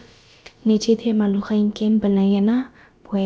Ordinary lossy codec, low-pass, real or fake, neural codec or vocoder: none; none; fake; codec, 16 kHz, about 1 kbps, DyCAST, with the encoder's durations